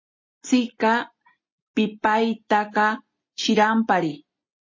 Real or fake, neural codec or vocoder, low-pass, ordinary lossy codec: real; none; 7.2 kHz; MP3, 32 kbps